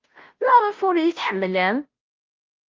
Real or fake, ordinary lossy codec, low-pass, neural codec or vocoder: fake; Opus, 24 kbps; 7.2 kHz; codec, 16 kHz, 0.5 kbps, FunCodec, trained on Chinese and English, 25 frames a second